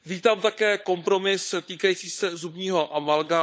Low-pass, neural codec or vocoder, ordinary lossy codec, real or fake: none; codec, 16 kHz, 16 kbps, FunCodec, trained on LibriTTS, 50 frames a second; none; fake